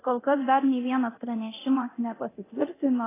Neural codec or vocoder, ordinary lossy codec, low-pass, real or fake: codec, 24 kHz, 0.9 kbps, DualCodec; AAC, 16 kbps; 3.6 kHz; fake